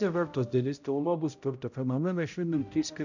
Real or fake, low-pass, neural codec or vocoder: fake; 7.2 kHz; codec, 16 kHz, 0.5 kbps, X-Codec, HuBERT features, trained on balanced general audio